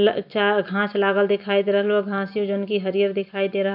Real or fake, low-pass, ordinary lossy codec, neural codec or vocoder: real; 5.4 kHz; none; none